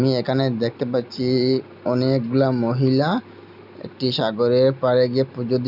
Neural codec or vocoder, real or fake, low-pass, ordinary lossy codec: none; real; 5.4 kHz; none